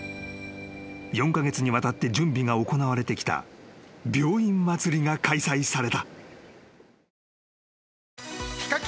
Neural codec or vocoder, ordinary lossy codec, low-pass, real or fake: none; none; none; real